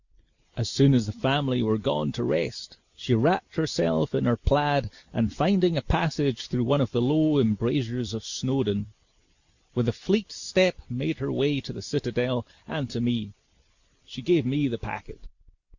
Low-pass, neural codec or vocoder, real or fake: 7.2 kHz; none; real